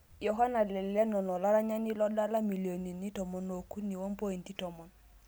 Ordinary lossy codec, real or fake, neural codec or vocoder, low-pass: none; real; none; none